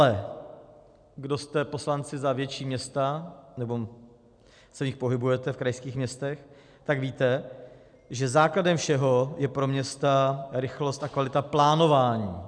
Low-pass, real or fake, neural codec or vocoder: 9.9 kHz; real; none